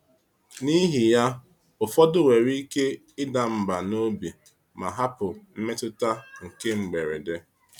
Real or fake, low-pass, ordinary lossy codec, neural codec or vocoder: real; 19.8 kHz; none; none